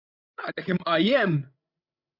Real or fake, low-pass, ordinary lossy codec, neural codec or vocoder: fake; 5.4 kHz; AAC, 48 kbps; codec, 16 kHz, 16 kbps, FreqCodec, larger model